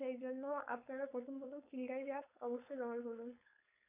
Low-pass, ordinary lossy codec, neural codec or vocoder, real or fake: 3.6 kHz; none; codec, 16 kHz, 4.8 kbps, FACodec; fake